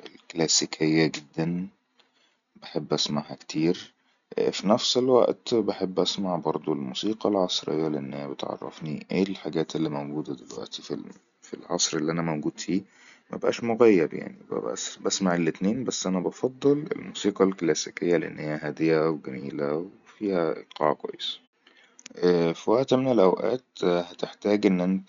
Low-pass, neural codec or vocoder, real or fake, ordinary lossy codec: 7.2 kHz; none; real; none